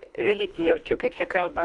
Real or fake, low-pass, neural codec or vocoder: fake; 10.8 kHz; codec, 24 kHz, 1.5 kbps, HILCodec